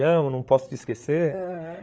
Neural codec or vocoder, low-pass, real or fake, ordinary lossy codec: codec, 16 kHz, 8 kbps, FreqCodec, larger model; none; fake; none